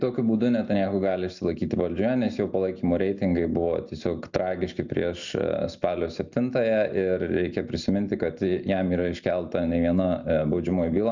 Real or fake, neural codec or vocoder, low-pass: real; none; 7.2 kHz